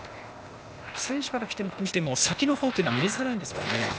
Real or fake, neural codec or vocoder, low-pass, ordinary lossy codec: fake; codec, 16 kHz, 0.8 kbps, ZipCodec; none; none